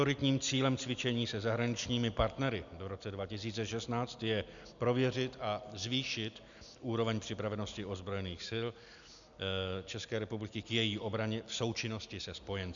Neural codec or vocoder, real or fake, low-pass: none; real; 7.2 kHz